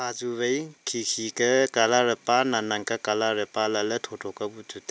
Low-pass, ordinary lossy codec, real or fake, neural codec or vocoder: none; none; real; none